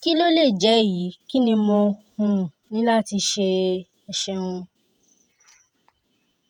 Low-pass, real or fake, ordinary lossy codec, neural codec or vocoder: 19.8 kHz; fake; MP3, 96 kbps; vocoder, 48 kHz, 128 mel bands, Vocos